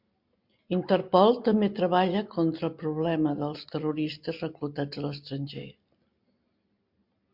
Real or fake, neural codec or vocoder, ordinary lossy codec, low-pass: real; none; MP3, 48 kbps; 5.4 kHz